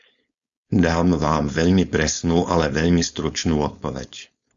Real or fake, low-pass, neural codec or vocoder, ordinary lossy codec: fake; 7.2 kHz; codec, 16 kHz, 4.8 kbps, FACodec; Opus, 64 kbps